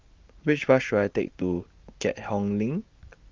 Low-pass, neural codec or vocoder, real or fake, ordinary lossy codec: 7.2 kHz; none; real; Opus, 24 kbps